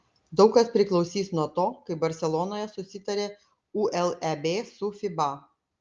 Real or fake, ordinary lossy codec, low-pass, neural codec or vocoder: real; Opus, 32 kbps; 7.2 kHz; none